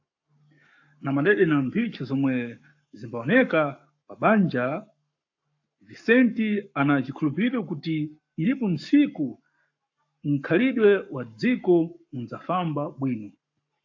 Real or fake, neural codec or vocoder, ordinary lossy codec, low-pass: fake; codec, 44.1 kHz, 7.8 kbps, Pupu-Codec; AAC, 48 kbps; 7.2 kHz